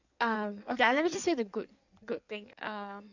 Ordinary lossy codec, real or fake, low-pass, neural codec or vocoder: none; fake; 7.2 kHz; codec, 16 kHz in and 24 kHz out, 1.1 kbps, FireRedTTS-2 codec